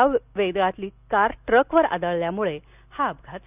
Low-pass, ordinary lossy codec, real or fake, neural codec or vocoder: 3.6 kHz; none; real; none